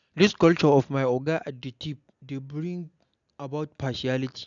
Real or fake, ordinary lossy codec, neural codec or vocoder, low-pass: real; none; none; 7.2 kHz